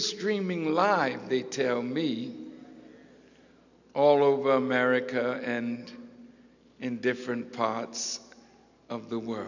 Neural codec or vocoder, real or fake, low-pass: none; real; 7.2 kHz